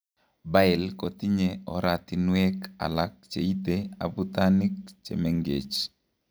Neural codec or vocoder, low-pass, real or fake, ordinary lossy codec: none; none; real; none